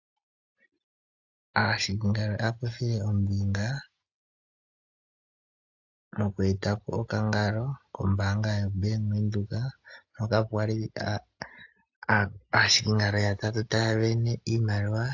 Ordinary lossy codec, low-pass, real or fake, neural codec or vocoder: AAC, 48 kbps; 7.2 kHz; real; none